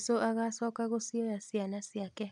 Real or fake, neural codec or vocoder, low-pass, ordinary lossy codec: real; none; 10.8 kHz; none